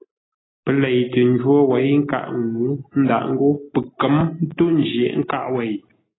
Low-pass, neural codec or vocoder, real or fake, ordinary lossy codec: 7.2 kHz; none; real; AAC, 16 kbps